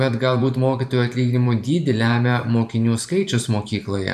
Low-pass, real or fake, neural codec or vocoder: 14.4 kHz; fake; vocoder, 44.1 kHz, 128 mel bands every 512 samples, BigVGAN v2